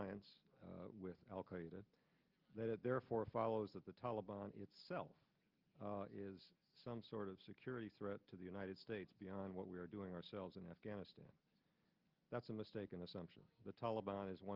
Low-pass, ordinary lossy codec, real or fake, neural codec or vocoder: 5.4 kHz; Opus, 32 kbps; real; none